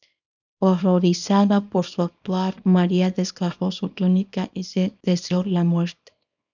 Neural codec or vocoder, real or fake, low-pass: codec, 24 kHz, 0.9 kbps, WavTokenizer, small release; fake; 7.2 kHz